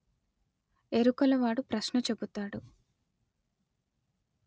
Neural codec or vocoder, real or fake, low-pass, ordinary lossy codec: none; real; none; none